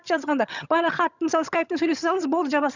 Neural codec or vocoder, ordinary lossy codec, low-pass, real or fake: vocoder, 22.05 kHz, 80 mel bands, HiFi-GAN; none; 7.2 kHz; fake